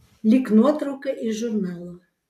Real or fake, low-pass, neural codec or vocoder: real; 14.4 kHz; none